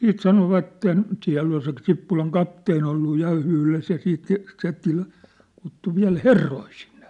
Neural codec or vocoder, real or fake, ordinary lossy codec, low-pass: none; real; none; 10.8 kHz